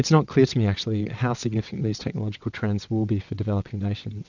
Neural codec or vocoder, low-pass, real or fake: vocoder, 22.05 kHz, 80 mel bands, WaveNeXt; 7.2 kHz; fake